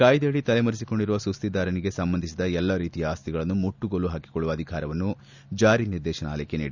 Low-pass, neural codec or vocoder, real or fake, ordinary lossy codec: 7.2 kHz; none; real; none